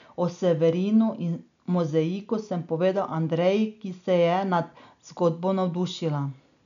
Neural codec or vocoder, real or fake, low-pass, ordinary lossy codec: none; real; 7.2 kHz; none